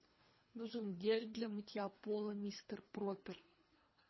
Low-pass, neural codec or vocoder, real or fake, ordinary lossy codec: 7.2 kHz; codec, 24 kHz, 3 kbps, HILCodec; fake; MP3, 24 kbps